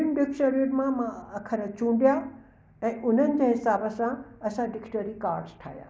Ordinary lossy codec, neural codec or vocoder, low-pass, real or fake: none; none; none; real